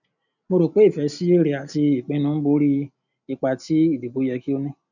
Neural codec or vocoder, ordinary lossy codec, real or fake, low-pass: none; none; real; 7.2 kHz